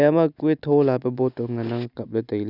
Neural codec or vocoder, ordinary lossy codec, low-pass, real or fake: none; none; 5.4 kHz; real